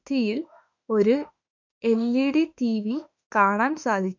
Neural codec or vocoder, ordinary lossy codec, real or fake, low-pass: autoencoder, 48 kHz, 32 numbers a frame, DAC-VAE, trained on Japanese speech; none; fake; 7.2 kHz